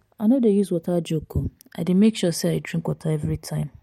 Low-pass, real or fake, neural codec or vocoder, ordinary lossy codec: 19.8 kHz; real; none; MP3, 64 kbps